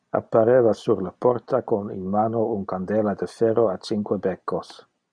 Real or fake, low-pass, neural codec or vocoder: real; 9.9 kHz; none